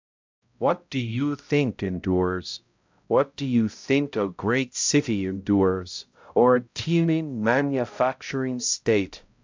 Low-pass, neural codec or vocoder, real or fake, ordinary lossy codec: 7.2 kHz; codec, 16 kHz, 0.5 kbps, X-Codec, HuBERT features, trained on balanced general audio; fake; MP3, 64 kbps